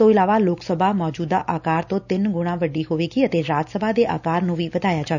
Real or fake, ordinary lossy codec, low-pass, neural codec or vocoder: real; none; 7.2 kHz; none